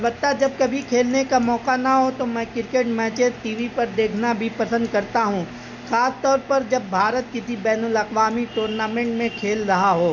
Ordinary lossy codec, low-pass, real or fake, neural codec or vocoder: none; 7.2 kHz; real; none